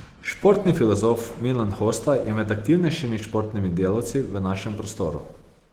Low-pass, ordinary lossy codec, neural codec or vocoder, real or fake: 19.8 kHz; Opus, 16 kbps; autoencoder, 48 kHz, 128 numbers a frame, DAC-VAE, trained on Japanese speech; fake